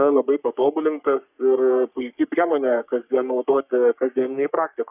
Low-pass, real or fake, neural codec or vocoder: 3.6 kHz; fake; codec, 44.1 kHz, 3.4 kbps, Pupu-Codec